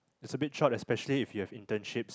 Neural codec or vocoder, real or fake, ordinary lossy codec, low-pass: none; real; none; none